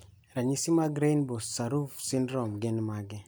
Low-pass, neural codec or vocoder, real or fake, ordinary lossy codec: none; none; real; none